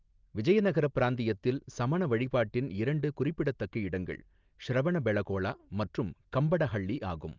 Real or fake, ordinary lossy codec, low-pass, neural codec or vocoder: real; Opus, 32 kbps; 7.2 kHz; none